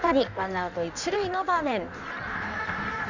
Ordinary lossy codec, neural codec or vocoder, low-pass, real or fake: none; codec, 16 kHz in and 24 kHz out, 1.1 kbps, FireRedTTS-2 codec; 7.2 kHz; fake